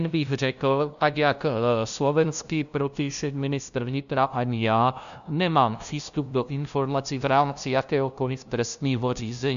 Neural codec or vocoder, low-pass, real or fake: codec, 16 kHz, 0.5 kbps, FunCodec, trained on LibriTTS, 25 frames a second; 7.2 kHz; fake